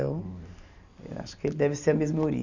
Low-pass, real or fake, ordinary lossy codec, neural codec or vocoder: 7.2 kHz; real; none; none